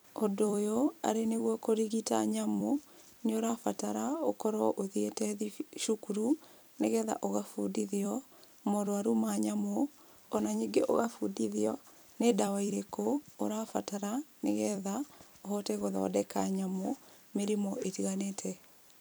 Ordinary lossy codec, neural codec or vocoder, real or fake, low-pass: none; vocoder, 44.1 kHz, 128 mel bands every 256 samples, BigVGAN v2; fake; none